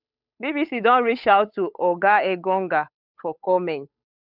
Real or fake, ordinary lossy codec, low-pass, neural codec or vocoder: fake; none; 5.4 kHz; codec, 16 kHz, 8 kbps, FunCodec, trained on Chinese and English, 25 frames a second